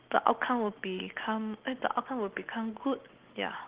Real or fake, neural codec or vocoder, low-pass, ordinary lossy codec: real; none; 3.6 kHz; Opus, 16 kbps